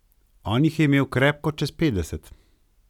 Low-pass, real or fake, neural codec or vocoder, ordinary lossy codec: 19.8 kHz; real; none; none